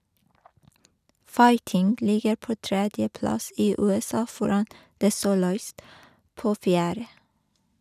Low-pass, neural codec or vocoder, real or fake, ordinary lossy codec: 14.4 kHz; none; real; none